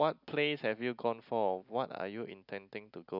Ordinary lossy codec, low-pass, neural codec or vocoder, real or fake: none; 5.4 kHz; none; real